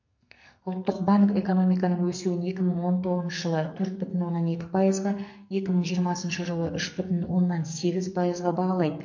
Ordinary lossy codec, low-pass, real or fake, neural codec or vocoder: MP3, 48 kbps; 7.2 kHz; fake; codec, 44.1 kHz, 2.6 kbps, SNAC